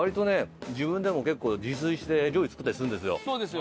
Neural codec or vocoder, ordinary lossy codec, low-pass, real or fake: none; none; none; real